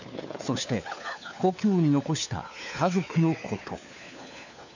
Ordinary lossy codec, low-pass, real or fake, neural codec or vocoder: none; 7.2 kHz; fake; codec, 16 kHz, 4 kbps, FunCodec, trained on LibriTTS, 50 frames a second